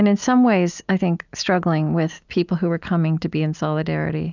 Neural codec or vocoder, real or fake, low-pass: none; real; 7.2 kHz